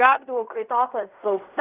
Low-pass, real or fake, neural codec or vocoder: 3.6 kHz; fake; codec, 16 kHz in and 24 kHz out, 0.4 kbps, LongCat-Audio-Codec, fine tuned four codebook decoder